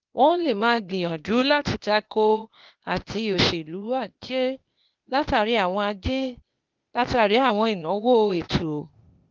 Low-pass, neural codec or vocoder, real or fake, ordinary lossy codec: 7.2 kHz; codec, 16 kHz, 0.8 kbps, ZipCodec; fake; Opus, 32 kbps